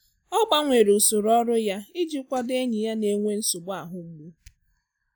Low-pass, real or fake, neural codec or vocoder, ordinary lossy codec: none; real; none; none